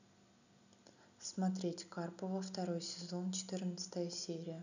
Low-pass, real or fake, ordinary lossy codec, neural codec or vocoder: 7.2 kHz; real; none; none